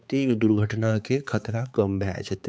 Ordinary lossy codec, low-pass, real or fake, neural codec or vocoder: none; none; fake; codec, 16 kHz, 4 kbps, X-Codec, HuBERT features, trained on balanced general audio